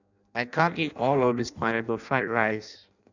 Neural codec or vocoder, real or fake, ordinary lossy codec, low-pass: codec, 16 kHz in and 24 kHz out, 0.6 kbps, FireRedTTS-2 codec; fake; none; 7.2 kHz